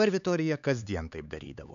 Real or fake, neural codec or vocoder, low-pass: fake; codec, 16 kHz, 4 kbps, X-Codec, HuBERT features, trained on LibriSpeech; 7.2 kHz